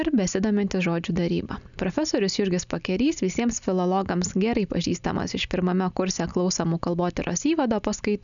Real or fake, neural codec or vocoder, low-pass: real; none; 7.2 kHz